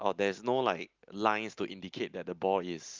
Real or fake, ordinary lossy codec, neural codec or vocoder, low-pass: real; Opus, 24 kbps; none; 7.2 kHz